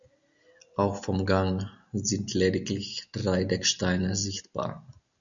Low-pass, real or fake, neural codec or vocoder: 7.2 kHz; real; none